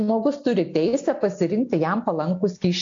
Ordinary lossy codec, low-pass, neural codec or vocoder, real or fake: AAC, 48 kbps; 7.2 kHz; none; real